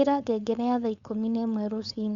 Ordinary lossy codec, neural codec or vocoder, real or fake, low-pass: Opus, 64 kbps; codec, 16 kHz, 4.8 kbps, FACodec; fake; 7.2 kHz